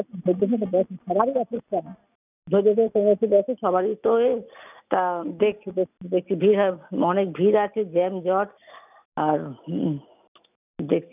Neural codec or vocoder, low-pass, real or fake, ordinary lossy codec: none; 3.6 kHz; real; none